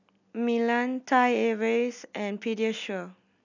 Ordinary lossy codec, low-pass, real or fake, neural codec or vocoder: none; 7.2 kHz; real; none